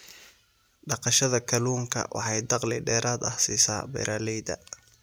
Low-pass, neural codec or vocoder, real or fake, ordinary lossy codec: none; none; real; none